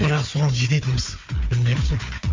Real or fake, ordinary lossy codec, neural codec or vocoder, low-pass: fake; MP3, 48 kbps; codec, 16 kHz, 4 kbps, FunCodec, trained on Chinese and English, 50 frames a second; 7.2 kHz